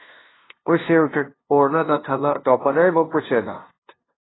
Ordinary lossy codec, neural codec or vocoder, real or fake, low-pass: AAC, 16 kbps; codec, 16 kHz, 0.5 kbps, FunCodec, trained on LibriTTS, 25 frames a second; fake; 7.2 kHz